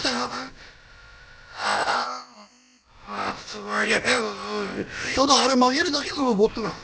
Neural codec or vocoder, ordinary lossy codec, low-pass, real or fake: codec, 16 kHz, about 1 kbps, DyCAST, with the encoder's durations; none; none; fake